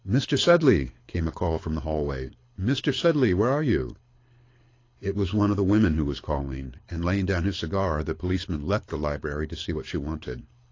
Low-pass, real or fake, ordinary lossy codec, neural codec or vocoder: 7.2 kHz; fake; AAC, 32 kbps; codec, 24 kHz, 6 kbps, HILCodec